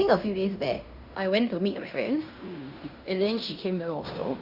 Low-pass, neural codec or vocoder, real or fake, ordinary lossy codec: 5.4 kHz; codec, 16 kHz in and 24 kHz out, 0.9 kbps, LongCat-Audio-Codec, fine tuned four codebook decoder; fake; Opus, 64 kbps